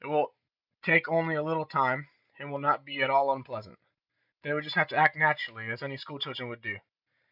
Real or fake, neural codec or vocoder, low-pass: real; none; 5.4 kHz